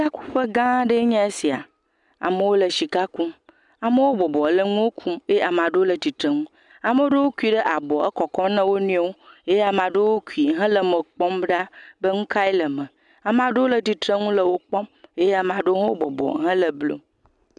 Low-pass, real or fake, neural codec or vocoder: 10.8 kHz; real; none